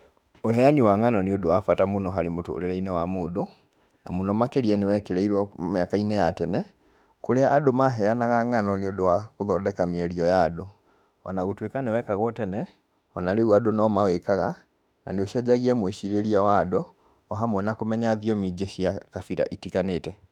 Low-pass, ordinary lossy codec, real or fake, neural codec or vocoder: 19.8 kHz; none; fake; autoencoder, 48 kHz, 32 numbers a frame, DAC-VAE, trained on Japanese speech